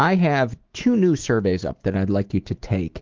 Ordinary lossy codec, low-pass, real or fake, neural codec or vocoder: Opus, 16 kbps; 7.2 kHz; fake; autoencoder, 48 kHz, 128 numbers a frame, DAC-VAE, trained on Japanese speech